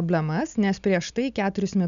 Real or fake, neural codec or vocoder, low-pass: real; none; 7.2 kHz